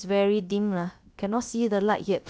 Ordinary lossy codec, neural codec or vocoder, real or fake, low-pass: none; codec, 16 kHz, about 1 kbps, DyCAST, with the encoder's durations; fake; none